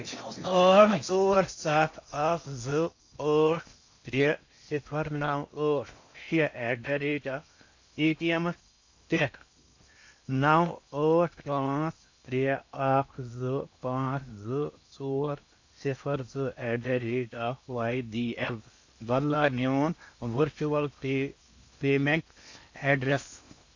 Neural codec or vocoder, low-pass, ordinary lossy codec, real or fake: codec, 16 kHz in and 24 kHz out, 0.6 kbps, FocalCodec, streaming, 4096 codes; 7.2 kHz; AAC, 48 kbps; fake